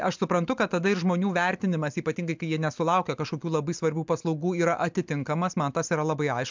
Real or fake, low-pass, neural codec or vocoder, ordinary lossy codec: real; 7.2 kHz; none; MP3, 64 kbps